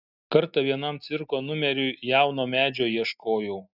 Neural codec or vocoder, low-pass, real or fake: none; 5.4 kHz; real